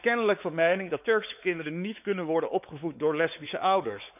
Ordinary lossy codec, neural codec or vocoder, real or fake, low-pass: none; codec, 16 kHz, 4 kbps, X-Codec, WavLM features, trained on Multilingual LibriSpeech; fake; 3.6 kHz